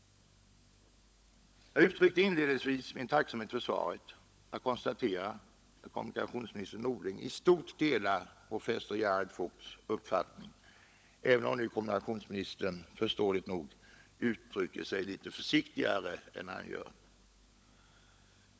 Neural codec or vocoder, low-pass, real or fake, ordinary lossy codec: codec, 16 kHz, 16 kbps, FunCodec, trained on LibriTTS, 50 frames a second; none; fake; none